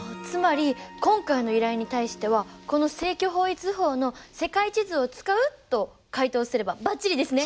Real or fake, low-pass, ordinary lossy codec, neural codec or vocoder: real; none; none; none